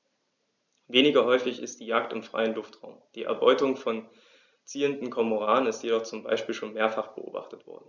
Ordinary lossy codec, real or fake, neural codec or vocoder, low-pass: none; real; none; 7.2 kHz